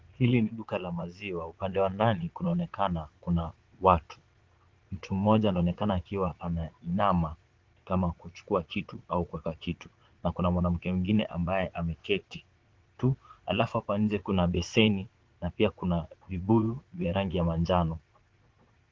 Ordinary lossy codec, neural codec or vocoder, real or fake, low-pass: Opus, 16 kbps; vocoder, 22.05 kHz, 80 mel bands, Vocos; fake; 7.2 kHz